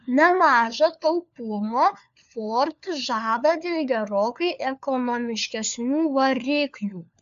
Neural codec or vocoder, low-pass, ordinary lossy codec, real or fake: codec, 16 kHz, 4 kbps, FunCodec, trained on LibriTTS, 50 frames a second; 7.2 kHz; AAC, 96 kbps; fake